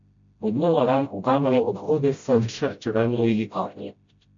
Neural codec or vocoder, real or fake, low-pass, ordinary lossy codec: codec, 16 kHz, 0.5 kbps, FreqCodec, smaller model; fake; 7.2 kHz; MP3, 48 kbps